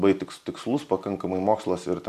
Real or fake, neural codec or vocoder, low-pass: real; none; 14.4 kHz